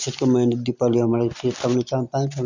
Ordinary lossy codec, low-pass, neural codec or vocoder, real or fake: Opus, 64 kbps; 7.2 kHz; none; real